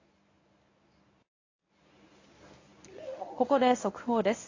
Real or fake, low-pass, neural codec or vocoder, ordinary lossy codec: fake; 7.2 kHz; codec, 24 kHz, 0.9 kbps, WavTokenizer, medium speech release version 1; AAC, 32 kbps